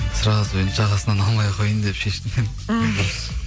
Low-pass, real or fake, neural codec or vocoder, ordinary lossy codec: none; real; none; none